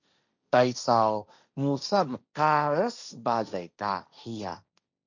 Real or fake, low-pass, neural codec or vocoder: fake; 7.2 kHz; codec, 16 kHz, 1.1 kbps, Voila-Tokenizer